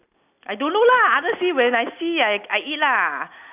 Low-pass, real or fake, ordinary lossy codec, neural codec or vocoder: 3.6 kHz; real; none; none